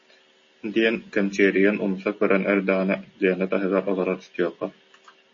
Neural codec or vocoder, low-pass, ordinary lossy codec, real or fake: none; 7.2 kHz; MP3, 32 kbps; real